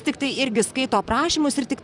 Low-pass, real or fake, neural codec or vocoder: 10.8 kHz; fake; vocoder, 44.1 kHz, 128 mel bands, Pupu-Vocoder